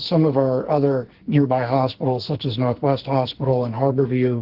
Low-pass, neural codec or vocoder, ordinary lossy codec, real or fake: 5.4 kHz; codec, 44.1 kHz, 2.6 kbps, SNAC; Opus, 16 kbps; fake